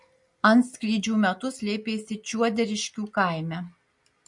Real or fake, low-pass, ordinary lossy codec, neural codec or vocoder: real; 10.8 kHz; MP3, 48 kbps; none